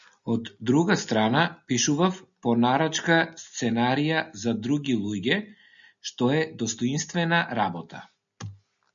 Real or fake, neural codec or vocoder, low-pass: real; none; 7.2 kHz